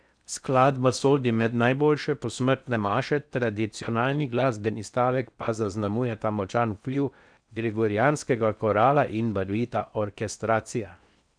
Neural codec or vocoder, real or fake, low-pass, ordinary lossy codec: codec, 16 kHz in and 24 kHz out, 0.6 kbps, FocalCodec, streaming, 4096 codes; fake; 9.9 kHz; none